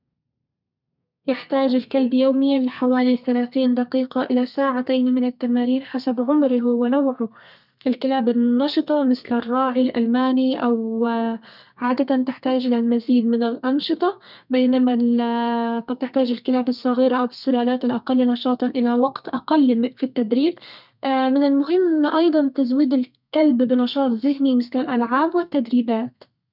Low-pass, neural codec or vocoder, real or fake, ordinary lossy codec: 5.4 kHz; codec, 32 kHz, 1.9 kbps, SNAC; fake; none